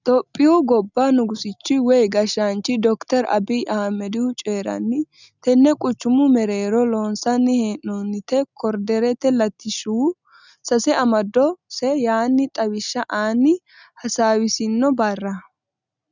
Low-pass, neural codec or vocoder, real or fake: 7.2 kHz; none; real